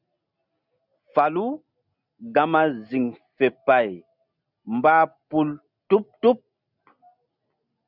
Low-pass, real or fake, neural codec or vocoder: 5.4 kHz; real; none